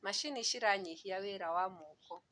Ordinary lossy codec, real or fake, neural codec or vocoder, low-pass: none; real; none; 9.9 kHz